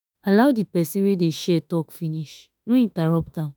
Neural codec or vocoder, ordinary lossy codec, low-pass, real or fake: autoencoder, 48 kHz, 32 numbers a frame, DAC-VAE, trained on Japanese speech; none; none; fake